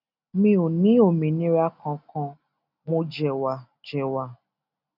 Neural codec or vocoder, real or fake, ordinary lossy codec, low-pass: none; real; none; 5.4 kHz